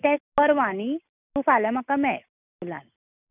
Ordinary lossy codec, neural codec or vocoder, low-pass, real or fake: none; none; 3.6 kHz; real